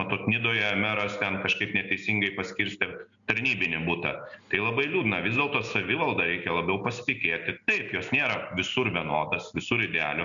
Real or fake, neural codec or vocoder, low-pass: real; none; 7.2 kHz